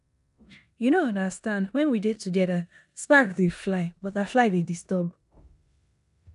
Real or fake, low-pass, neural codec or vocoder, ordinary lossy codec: fake; 10.8 kHz; codec, 16 kHz in and 24 kHz out, 0.9 kbps, LongCat-Audio-Codec, four codebook decoder; none